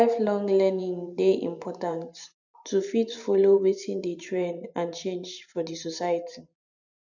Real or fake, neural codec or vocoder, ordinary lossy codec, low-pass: fake; vocoder, 44.1 kHz, 128 mel bands every 512 samples, BigVGAN v2; none; 7.2 kHz